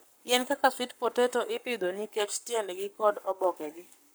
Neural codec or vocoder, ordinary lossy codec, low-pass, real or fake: codec, 44.1 kHz, 7.8 kbps, Pupu-Codec; none; none; fake